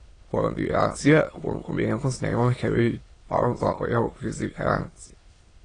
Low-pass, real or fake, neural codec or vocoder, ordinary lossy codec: 9.9 kHz; fake; autoencoder, 22.05 kHz, a latent of 192 numbers a frame, VITS, trained on many speakers; AAC, 32 kbps